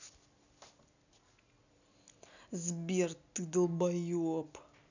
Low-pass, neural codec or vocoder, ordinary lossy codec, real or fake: 7.2 kHz; none; none; real